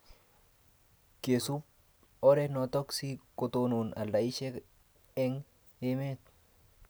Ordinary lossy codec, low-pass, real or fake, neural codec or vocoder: none; none; real; none